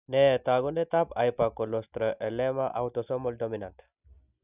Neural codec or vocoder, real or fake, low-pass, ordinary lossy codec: none; real; 3.6 kHz; none